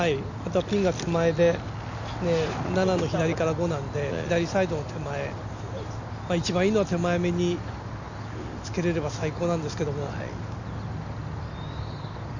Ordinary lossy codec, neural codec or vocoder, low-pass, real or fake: none; none; 7.2 kHz; real